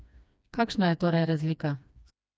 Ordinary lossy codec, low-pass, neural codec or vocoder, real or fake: none; none; codec, 16 kHz, 2 kbps, FreqCodec, smaller model; fake